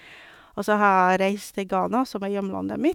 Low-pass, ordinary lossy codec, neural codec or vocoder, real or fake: 19.8 kHz; none; autoencoder, 48 kHz, 128 numbers a frame, DAC-VAE, trained on Japanese speech; fake